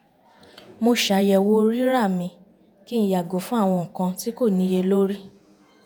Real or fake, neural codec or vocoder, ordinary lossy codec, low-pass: fake; vocoder, 48 kHz, 128 mel bands, Vocos; none; none